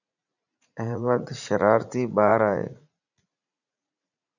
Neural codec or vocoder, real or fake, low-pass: vocoder, 44.1 kHz, 80 mel bands, Vocos; fake; 7.2 kHz